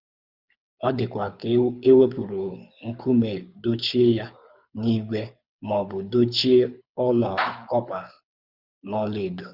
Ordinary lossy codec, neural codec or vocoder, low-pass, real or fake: Opus, 64 kbps; codec, 24 kHz, 6 kbps, HILCodec; 5.4 kHz; fake